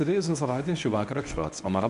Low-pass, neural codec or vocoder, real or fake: 10.8 kHz; codec, 24 kHz, 0.9 kbps, WavTokenizer, medium speech release version 1; fake